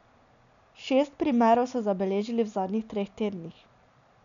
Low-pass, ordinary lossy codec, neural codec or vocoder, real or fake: 7.2 kHz; none; none; real